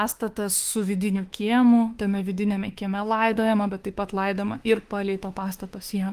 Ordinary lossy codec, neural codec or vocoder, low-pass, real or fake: Opus, 24 kbps; autoencoder, 48 kHz, 32 numbers a frame, DAC-VAE, trained on Japanese speech; 14.4 kHz; fake